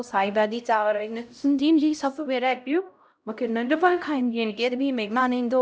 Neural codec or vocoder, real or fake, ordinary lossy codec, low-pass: codec, 16 kHz, 0.5 kbps, X-Codec, HuBERT features, trained on LibriSpeech; fake; none; none